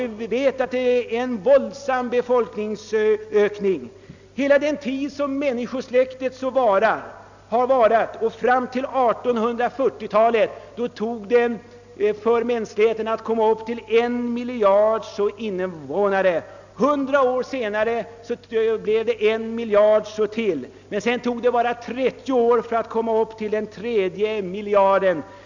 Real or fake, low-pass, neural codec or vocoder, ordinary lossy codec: real; 7.2 kHz; none; none